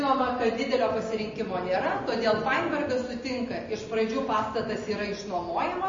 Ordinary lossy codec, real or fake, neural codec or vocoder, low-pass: MP3, 32 kbps; real; none; 7.2 kHz